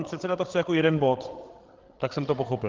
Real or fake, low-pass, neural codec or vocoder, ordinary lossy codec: fake; 7.2 kHz; codec, 16 kHz, 8 kbps, FreqCodec, larger model; Opus, 16 kbps